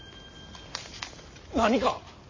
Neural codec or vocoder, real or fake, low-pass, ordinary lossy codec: none; real; 7.2 kHz; MP3, 32 kbps